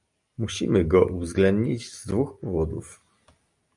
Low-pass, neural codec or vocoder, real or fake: 10.8 kHz; none; real